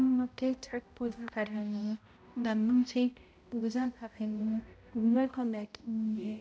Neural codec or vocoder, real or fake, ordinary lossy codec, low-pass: codec, 16 kHz, 0.5 kbps, X-Codec, HuBERT features, trained on balanced general audio; fake; none; none